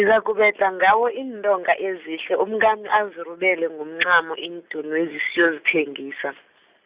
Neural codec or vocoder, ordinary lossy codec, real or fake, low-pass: none; Opus, 64 kbps; real; 3.6 kHz